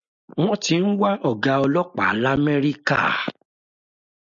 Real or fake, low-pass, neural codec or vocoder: real; 7.2 kHz; none